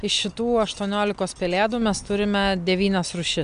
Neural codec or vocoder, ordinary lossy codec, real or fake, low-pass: none; MP3, 64 kbps; real; 9.9 kHz